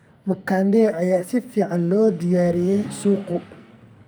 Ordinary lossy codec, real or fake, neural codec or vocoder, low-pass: none; fake; codec, 44.1 kHz, 2.6 kbps, SNAC; none